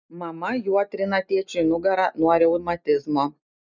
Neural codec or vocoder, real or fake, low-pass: none; real; 7.2 kHz